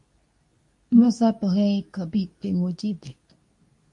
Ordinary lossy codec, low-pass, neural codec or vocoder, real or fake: MP3, 48 kbps; 10.8 kHz; codec, 24 kHz, 0.9 kbps, WavTokenizer, medium speech release version 2; fake